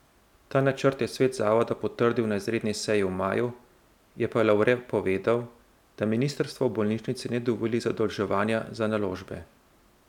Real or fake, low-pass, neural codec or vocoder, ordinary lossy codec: real; 19.8 kHz; none; none